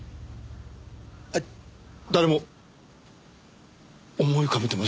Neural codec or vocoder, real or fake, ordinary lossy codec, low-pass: none; real; none; none